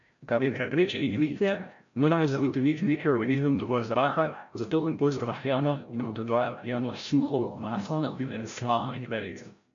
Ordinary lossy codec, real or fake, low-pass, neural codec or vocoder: MP3, 64 kbps; fake; 7.2 kHz; codec, 16 kHz, 0.5 kbps, FreqCodec, larger model